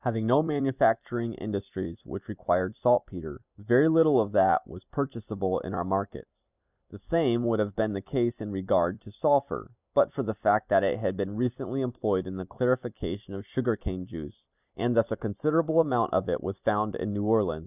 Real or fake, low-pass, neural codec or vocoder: fake; 3.6 kHz; vocoder, 44.1 kHz, 128 mel bands every 256 samples, BigVGAN v2